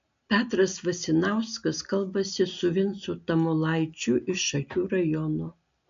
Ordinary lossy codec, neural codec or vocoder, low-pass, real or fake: MP3, 64 kbps; none; 7.2 kHz; real